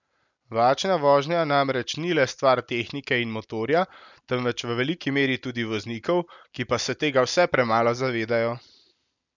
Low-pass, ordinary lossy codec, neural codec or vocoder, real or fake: 7.2 kHz; none; none; real